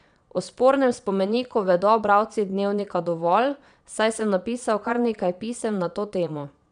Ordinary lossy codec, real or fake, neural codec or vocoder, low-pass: none; fake; vocoder, 22.05 kHz, 80 mel bands, Vocos; 9.9 kHz